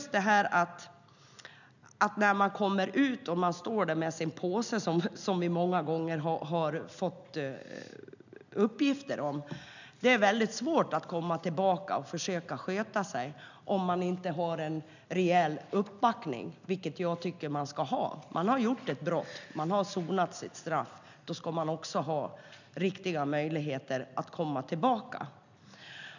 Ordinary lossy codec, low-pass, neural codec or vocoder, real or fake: none; 7.2 kHz; none; real